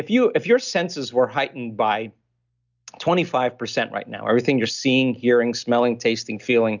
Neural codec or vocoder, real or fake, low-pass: none; real; 7.2 kHz